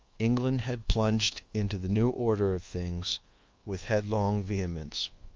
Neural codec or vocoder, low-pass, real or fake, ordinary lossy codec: codec, 24 kHz, 1.2 kbps, DualCodec; 7.2 kHz; fake; Opus, 32 kbps